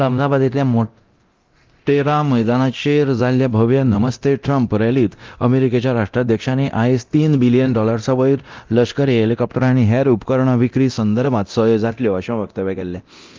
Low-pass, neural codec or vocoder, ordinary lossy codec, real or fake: 7.2 kHz; codec, 24 kHz, 0.9 kbps, DualCodec; Opus, 24 kbps; fake